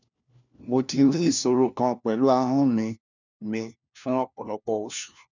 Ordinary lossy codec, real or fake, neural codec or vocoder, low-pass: none; fake; codec, 16 kHz, 1 kbps, FunCodec, trained on LibriTTS, 50 frames a second; 7.2 kHz